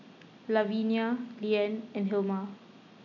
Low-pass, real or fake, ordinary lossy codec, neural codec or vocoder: 7.2 kHz; real; none; none